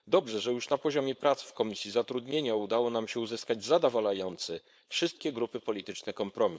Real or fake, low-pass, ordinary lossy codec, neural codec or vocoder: fake; none; none; codec, 16 kHz, 4.8 kbps, FACodec